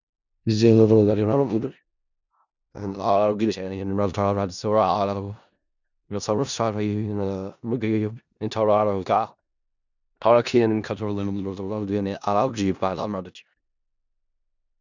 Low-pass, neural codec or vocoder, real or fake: 7.2 kHz; codec, 16 kHz in and 24 kHz out, 0.4 kbps, LongCat-Audio-Codec, four codebook decoder; fake